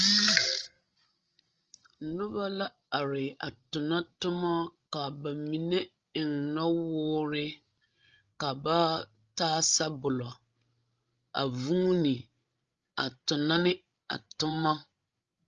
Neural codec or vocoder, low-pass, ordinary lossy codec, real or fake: codec, 16 kHz, 16 kbps, FreqCodec, larger model; 7.2 kHz; Opus, 24 kbps; fake